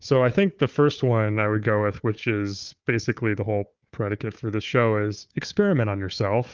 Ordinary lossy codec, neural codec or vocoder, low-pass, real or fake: Opus, 24 kbps; codec, 16 kHz, 4 kbps, FunCodec, trained on Chinese and English, 50 frames a second; 7.2 kHz; fake